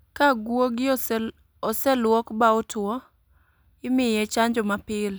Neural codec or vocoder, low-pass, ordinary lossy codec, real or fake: none; none; none; real